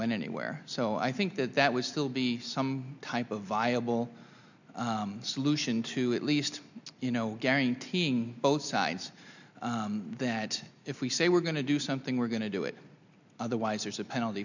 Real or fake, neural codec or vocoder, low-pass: real; none; 7.2 kHz